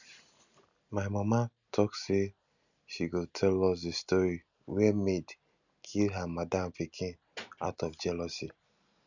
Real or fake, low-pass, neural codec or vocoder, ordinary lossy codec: real; 7.2 kHz; none; none